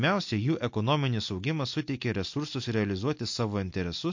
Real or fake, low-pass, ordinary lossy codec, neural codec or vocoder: real; 7.2 kHz; MP3, 48 kbps; none